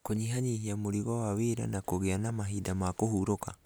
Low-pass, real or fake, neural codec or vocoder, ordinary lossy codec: none; real; none; none